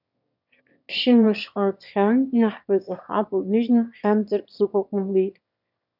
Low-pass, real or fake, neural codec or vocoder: 5.4 kHz; fake; autoencoder, 22.05 kHz, a latent of 192 numbers a frame, VITS, trained on one speaker